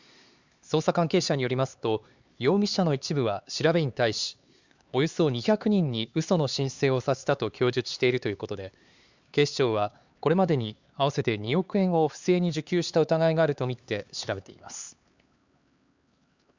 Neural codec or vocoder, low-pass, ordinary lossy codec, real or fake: codec, 16 kHz, 4 kbps, X-Codec, HuBERT features, trained on LibriSpeech; 7.2 kHz; Opus, 64 kbps; fake